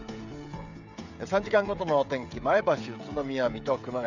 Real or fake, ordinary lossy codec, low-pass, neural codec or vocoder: fake; none; 7.2 kHz; codec, 16 kHz, 16 kbps, FreqCodec, smaller model